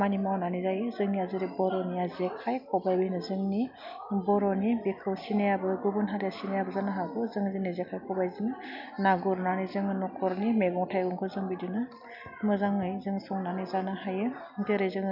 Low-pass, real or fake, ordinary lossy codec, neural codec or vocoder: 5.4 kHz; real; none; none